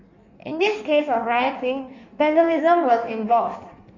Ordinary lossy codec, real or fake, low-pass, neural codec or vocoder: none; fake; 7.2 kHz; codec, 16 kHz in and 24 kHz out, 1.1 kbps, FireRedTTS-2 codec